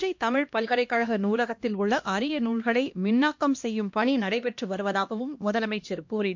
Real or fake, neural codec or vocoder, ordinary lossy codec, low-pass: fake; codec, 16 kHz, 1 kbps, X-Codec, HuBERT features, trained on LibriSpeech; MP3, 48 kbps; 7.2 kHz